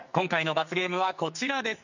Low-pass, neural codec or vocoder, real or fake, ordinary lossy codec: 7.2 kHz; codec, 44.1 kHz, 2.6 kbps, SNAC; fake; none